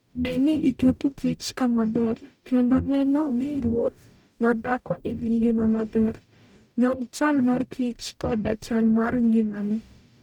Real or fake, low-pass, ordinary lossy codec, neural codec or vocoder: fake; 19.8 kHz; none; codec, 44.1 kHz, 0.9 kbps, DAC